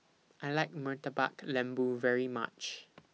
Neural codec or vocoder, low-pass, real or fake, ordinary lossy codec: none; none; real; none